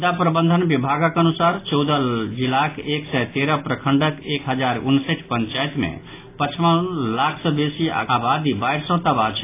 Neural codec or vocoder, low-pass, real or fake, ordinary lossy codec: none; 3.6 kHz; real; AAC, 24 kbps